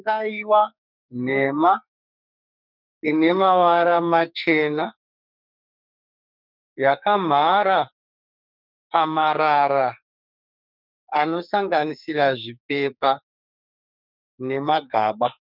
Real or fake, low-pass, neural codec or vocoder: fake; 5.4 kHz; codec, 44.1 kHz, 2.6 kbps, SNAC